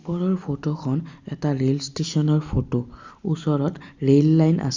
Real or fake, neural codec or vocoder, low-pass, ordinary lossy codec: real; none; 7.2 kHz; Opus, 64 kbps